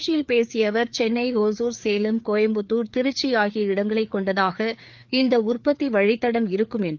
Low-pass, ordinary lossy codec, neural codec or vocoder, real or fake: 7.2 kHz; Opus, 24 kbps; codec, 16 kHz, 4 kbps, FreqCodec, larger model; fake